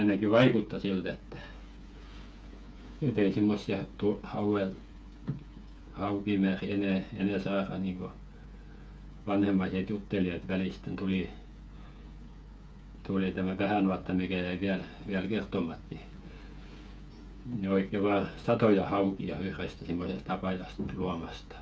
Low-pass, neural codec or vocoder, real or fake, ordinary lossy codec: none; codec, 16 kHz, 8 kbps, FreqCodec, smaller model; fake; none